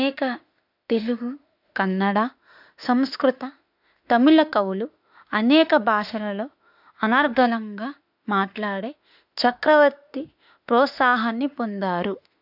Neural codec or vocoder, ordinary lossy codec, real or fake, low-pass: autoencoder, 48 kHz, 32 numbers a frame, DAC-VAE, trained on Japanese speech; none; fake; 5.4 kHz